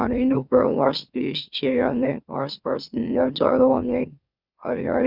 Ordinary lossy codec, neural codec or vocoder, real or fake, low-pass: Opus, 64 kbps; autoencoder, 44.1 kHz, a latent of 192 numbers a frame, MeloTTS; fake; 5.4 kHz